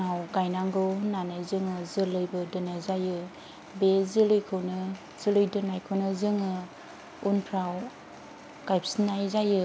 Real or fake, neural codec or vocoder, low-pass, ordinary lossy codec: real; none; none; none